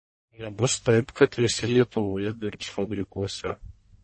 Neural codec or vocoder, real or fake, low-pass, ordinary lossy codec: codec, 44.1 kHz, 1.7 kbps, Pupu-Codec; fake; 9.9 kHz; MP3, 32 kbps